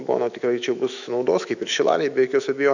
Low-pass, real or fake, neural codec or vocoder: 7.2 kHz; fake; autoencoder, 48 kHz, 128 numbers a frame, DAC-VAE, trained on Japanese speech